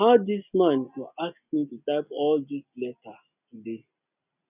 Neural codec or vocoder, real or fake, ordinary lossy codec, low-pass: none; real; none; 3.6 kHz